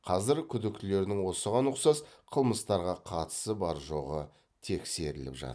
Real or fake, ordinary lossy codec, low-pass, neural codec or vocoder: real; none; none; none